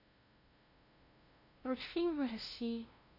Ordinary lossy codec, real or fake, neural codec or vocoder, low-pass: none; fake; codec, 16 kHz, 0.5 kbps, FunCodec, trained on LibriTTS, 25 frames a second; 5.4 kHz